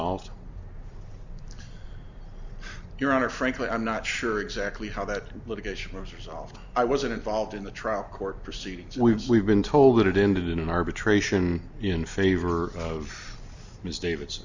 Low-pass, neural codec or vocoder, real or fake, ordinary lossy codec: 7.2 kHz; none; real; Opus, 64 kbps